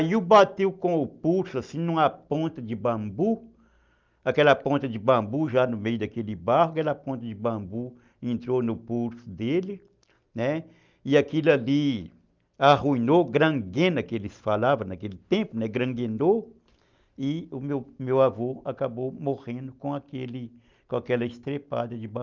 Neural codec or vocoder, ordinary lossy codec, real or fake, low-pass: none; Opus, 24 kbps; real; 7.2 kHz